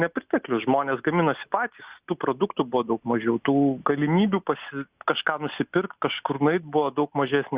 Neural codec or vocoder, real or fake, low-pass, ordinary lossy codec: none; real; 3.6 kHz; Opus, 64 kbps